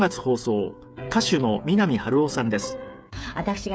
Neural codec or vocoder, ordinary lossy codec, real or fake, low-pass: codec, 16 kHz, 8 kbps, FreqCodec, smaller model; none; fake; none